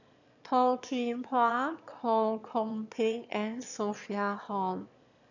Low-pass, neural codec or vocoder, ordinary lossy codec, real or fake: 7.2 kHz; autoencoder, 22.05 kHz, a latent of 192 numbers a frame, VITS, trained on one speaker; none; fake